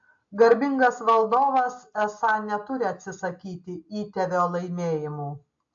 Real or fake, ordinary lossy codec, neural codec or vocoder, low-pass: real; AAC, 64 kbps; none; 7.2 kHz